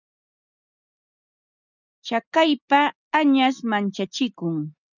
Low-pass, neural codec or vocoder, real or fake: 7.2 kHz; none; real